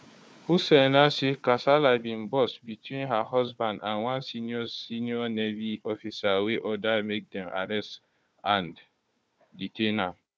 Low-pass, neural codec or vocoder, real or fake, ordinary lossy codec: none; codec, 16 kHz, 4 kbps, FunCodec, trained on Chinese and English, 50 frames a second; fake; none